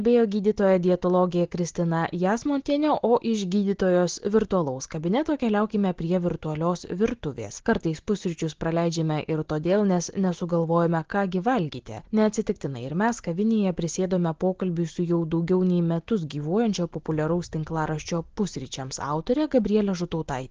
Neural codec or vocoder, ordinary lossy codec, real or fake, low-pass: none; Opus, 16 kbps; real; 7.2 kHz